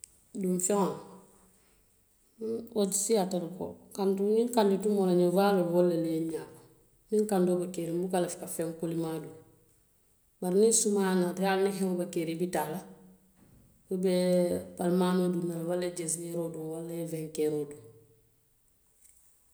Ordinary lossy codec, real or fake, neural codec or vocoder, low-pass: none; real; none; none